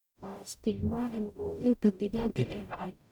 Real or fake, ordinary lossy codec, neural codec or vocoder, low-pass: fake; none; codec, 44.1 kHz, 0.9 kbps, DAC; 19.8 kHz